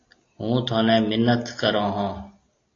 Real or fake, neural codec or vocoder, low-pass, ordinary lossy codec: real; none; 7.2 kHz; AAC, 32 kbps